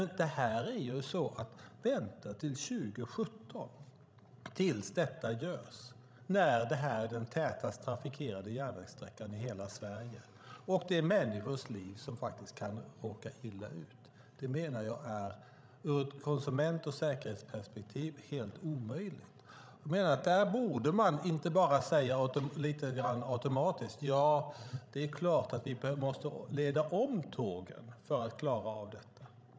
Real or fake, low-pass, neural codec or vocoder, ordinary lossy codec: fake; none; codec, 16 kHz, 16 kbps, FreqCodec, larger model; none